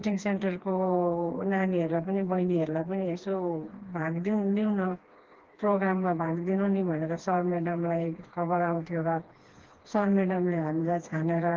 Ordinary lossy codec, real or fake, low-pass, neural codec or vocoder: Opus, 16 kbps; fake; 7.2 kHz; codec, 16 kHz, 2 kbps, FreqCodec, smaller model